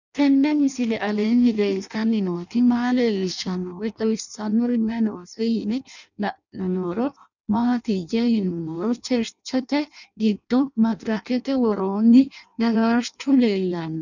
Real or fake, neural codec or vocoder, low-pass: fake; codec, 16 kHz in and 24 kHz out, 0.6 kbps, FireRedTTS-2 codec; 7.2 kHz